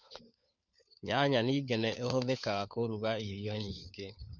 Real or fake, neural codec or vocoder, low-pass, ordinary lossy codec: fake; codec, 16 kHz, 4 kbps, FunCodec, trained on LibriTTS, 50 frames a second; 7.2 kHz; none